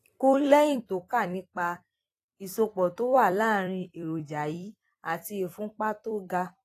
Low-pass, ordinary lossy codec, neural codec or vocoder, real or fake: 14.4 kHz; AAC, 48 kbps; vocoder, 44.1 kHz, 128 mel bands every 256 samples, BigVGAN v2; fake